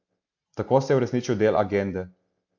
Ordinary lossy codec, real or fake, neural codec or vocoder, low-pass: AAC, 48 kbps; real; none; 7.2 kHz